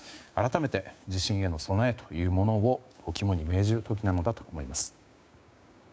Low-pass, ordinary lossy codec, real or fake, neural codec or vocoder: none; none; fake; codec, 16 kHz, 6 kbps, DAC